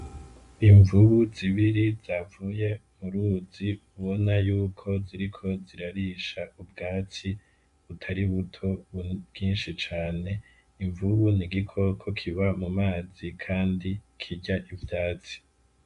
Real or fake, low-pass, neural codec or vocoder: fake; 10.8 kHz; vocoder, 24 kHz, 100 mel bands, Vocos